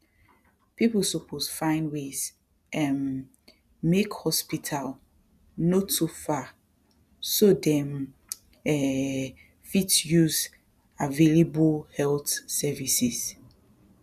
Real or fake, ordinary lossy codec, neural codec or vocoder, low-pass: real; none; none; 14.4 kHz